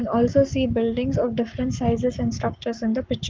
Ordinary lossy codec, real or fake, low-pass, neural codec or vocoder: Opus, 32 kbps; fake; 7.2 kHz; codec, 44.1 kHz, 7.8 kbps, Pupu-Codec